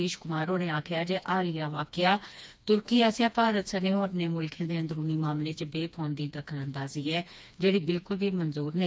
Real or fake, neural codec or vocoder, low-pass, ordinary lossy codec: fake; codec, 16 kHz, 2 kbps, FreqCodec, smaller model; none; none